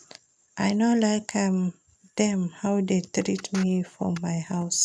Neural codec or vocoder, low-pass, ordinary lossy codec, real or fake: none; none; none; real